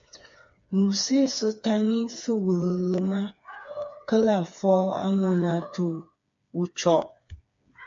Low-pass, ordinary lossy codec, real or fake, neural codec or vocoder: 7.2 kHz; MP3, 48 kbps; fake; codec, 16 kHz, 4 kbps, FreqCodec, smaller model